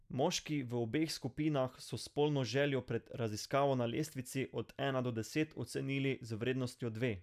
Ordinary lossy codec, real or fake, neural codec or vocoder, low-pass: none; real; none; 14.4 kHz